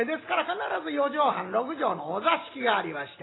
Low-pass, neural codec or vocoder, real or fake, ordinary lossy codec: 7.2 kHz; none; real; AAC, 16 kbps